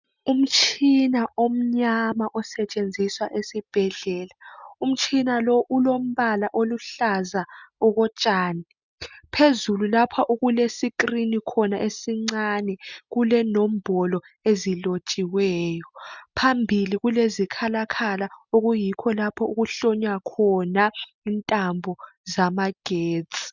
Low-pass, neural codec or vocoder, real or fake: 7.2 kHz; none; real